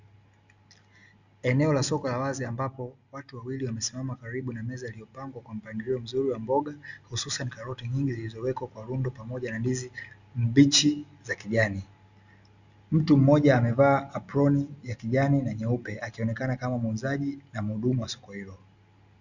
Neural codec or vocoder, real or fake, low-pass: none; real; 7.2 kHz